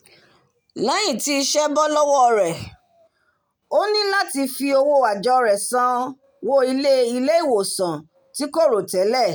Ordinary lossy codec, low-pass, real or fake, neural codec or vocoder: none; none; real; none